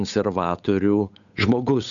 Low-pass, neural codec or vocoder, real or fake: 7.2 kHz; codec, 16 kHz, 8 kbps, FunCodec, trained on Chinese and English, 25 frames a second; fake